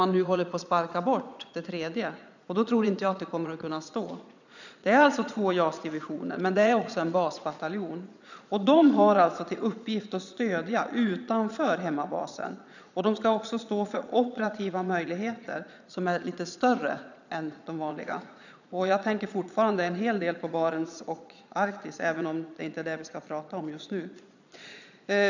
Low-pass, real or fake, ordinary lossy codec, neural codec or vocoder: 7.2 kHz; fake; none; vocoder, 22.05 kHz, 80 mel bands, Vocos